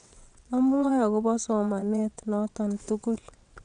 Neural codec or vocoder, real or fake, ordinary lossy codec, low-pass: vocoder, 22.05 kHz, 80 mel bands, WaveNeXt; fake; none; 9.9 kHz